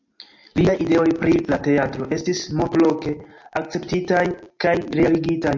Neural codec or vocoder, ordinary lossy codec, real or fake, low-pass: none; MP3, 48 kbps; real; 7.2 kHz